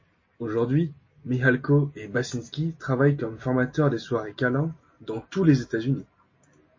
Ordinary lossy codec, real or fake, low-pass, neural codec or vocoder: MP3, 32 kbps; real; 7.2 kHz; none